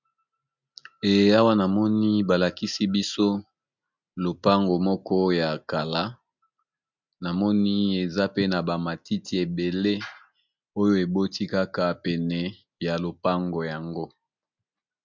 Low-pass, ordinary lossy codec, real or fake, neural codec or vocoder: 7.2 kHz; MP3, 64 kbps; real; none